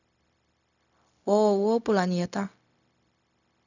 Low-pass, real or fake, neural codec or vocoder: 7.2 kHz; fake; codec, 16 kHz, 0.4 kbps, LongCat-Audio-Codec